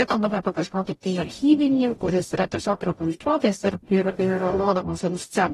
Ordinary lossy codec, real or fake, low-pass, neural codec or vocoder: AAC, 32 kbps; fake; 19.8 kHz; codec, 44.1 kHz, 0.9 kbps, DAC